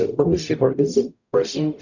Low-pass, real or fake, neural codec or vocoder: 7.2 kHz; fake; codec, 44.1 kHz, 0.9 kbps, DAC